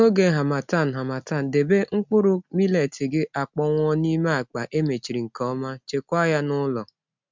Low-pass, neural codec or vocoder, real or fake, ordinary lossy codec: 7.2 kHz; none; real; MP3, 64 kbps